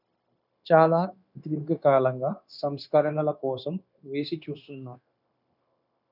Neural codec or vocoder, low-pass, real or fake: codec, 16 kHz, 0.9 kbps, LongCat-Audio-Codec; 5.4 kHz; fake